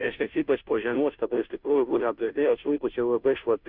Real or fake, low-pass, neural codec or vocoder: fake; 5.4 kHz; codec, 16 kHz, 0.5 kbps, FunCodec, trained on Chinese and English, 25 frames a second